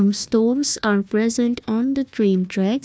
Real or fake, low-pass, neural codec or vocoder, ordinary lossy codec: fake; none; codec, 16 kHz, 1 kbps, FunCodec, trained on Chinese and English, 50 frames a second; none